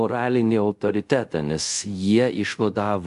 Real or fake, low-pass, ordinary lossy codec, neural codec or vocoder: fake; 10.8 kHz; MP3, 64 kbps; codec, 24 kHz, 0.5 kbps, DualCodec